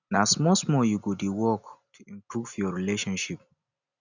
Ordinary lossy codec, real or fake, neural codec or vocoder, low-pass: none; real; none; 7.2 kHz